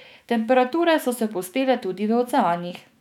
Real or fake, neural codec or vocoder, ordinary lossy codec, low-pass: fake; autoencoder, 48 kHz, 128 numbers a frame, DAC-VAE, trained on Japanese speech; none; 19.8 kHz